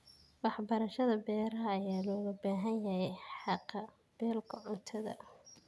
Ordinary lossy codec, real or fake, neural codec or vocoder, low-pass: none; real; none; none